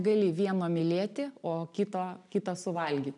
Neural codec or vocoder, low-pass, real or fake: none; 10.8 kHz; real